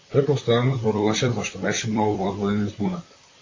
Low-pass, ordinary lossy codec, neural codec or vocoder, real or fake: 7.2 kHz; AAC, 32 kbps; vocoder, 44.1 kHz, 128 mel bands, Pupu-Vocoder; fake